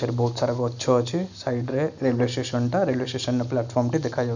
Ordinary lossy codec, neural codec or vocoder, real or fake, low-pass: none; none; real; 7.2 kHz